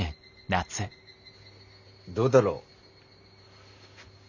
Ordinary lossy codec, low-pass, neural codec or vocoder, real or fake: none; 7.2 kHz; none; real